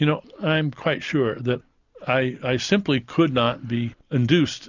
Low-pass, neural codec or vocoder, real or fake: 7.2 kHz; none; real